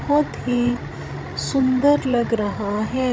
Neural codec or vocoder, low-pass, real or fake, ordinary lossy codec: codec, 16 kHz, 16 kbps, FreqCodec, larger model; none; fake; none